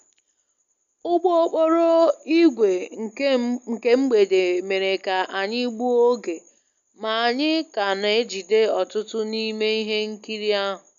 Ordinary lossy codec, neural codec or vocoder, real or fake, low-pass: none; none; real; 7.2 kHz